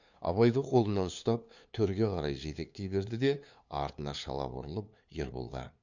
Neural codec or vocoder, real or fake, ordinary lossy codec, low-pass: codec, 16 kHz, 2 kbps, FunCodec, trained on LibriTTS, 25 frames a second; fake; none; 7.2 kHz